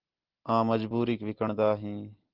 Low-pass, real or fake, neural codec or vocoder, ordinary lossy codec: 5.4 kHz; real; none; Opus, 16 kbps